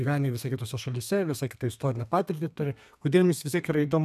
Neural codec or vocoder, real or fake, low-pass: codec, 32 kHz, 1.9 kbps, SNAC; fake; 14.4 kHz